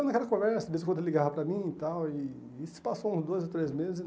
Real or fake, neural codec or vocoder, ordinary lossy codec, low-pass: real; none; none; none